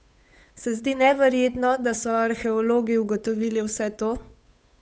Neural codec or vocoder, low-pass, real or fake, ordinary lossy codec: codec, 16 kHz, 8 kbps, FunCodec, trained on Chinese and English, 25 frames a second; none; fake; none